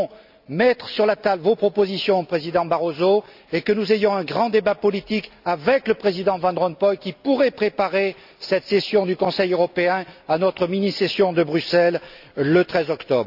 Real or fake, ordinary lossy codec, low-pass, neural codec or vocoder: real; none; 5.4 kHz; none